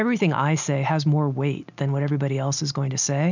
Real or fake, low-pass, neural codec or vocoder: real; 7.2 kHz; none